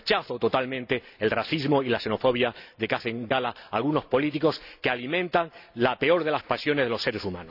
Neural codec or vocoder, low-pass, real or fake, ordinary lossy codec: none; 5.4 kHz; real; none